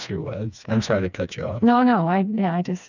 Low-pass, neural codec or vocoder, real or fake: 7.2 kHz; codec, 16 kHz, 2 kbps, FreqCodec, smaller model; fake